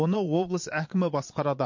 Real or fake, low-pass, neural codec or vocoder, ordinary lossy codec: fake; 7.2 kHz; vocoder, 22.05 kHz, 80 mel bands, Vocos; MP3, 48 kbps